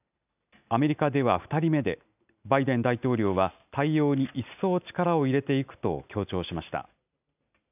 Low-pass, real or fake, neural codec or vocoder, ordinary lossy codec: 3.6 kHz; real; none; none